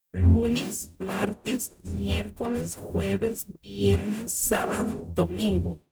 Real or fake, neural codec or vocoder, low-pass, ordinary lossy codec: fake; codec, 44.1 kHz, 0.9 kbps, DAC; none; none